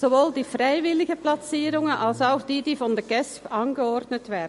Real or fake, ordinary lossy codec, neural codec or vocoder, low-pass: real; MP3, 48 kbps; none; 14.4 kHz